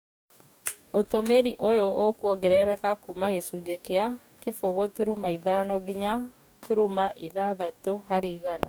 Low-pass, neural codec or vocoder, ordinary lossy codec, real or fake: none; codec, 44.1 kHz, 2.6 kbps, DAC; none; fake